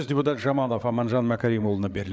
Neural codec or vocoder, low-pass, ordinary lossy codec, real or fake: codec, 16 kHz, 8 kbps, FreqCodec, larger model; none; none; fake